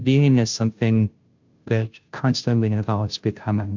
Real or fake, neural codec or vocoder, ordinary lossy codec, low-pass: fake; codec, 16 kHz, 0.5 kbps, FunCodec, trained on Chinese and English, 25 frames a second; MP3, 64 kbps; 7.2 kHz